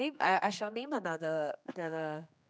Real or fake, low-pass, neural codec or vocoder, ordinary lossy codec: fake; none; codec, 16 kHz, 2 kbps, X-Codec, HuBERT features, trained on general audio; none